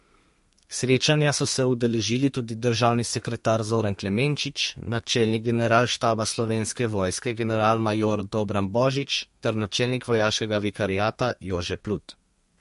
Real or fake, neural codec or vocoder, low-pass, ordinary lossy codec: fake; codec, 32 kHz, 1.9 kbps, SNAC; 14.4 kHz; MP3, 48 kbps